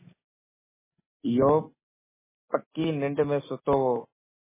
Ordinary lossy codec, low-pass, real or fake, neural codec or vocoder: MP3, 16 kbps; 3.6 kHz; real; none